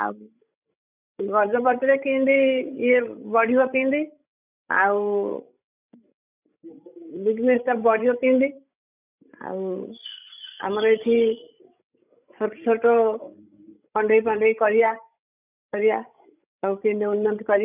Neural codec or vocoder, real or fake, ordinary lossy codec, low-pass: codec, 16 kHz, 16 kbps, FreqCodec, larger model; fake; none; 3.6 kHz